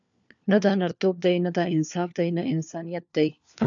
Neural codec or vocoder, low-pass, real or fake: codec, 16 kHz, 4 kbps, FunCodec, trained on LibriTTS, 50 frames a second; 7.2 kHz; fake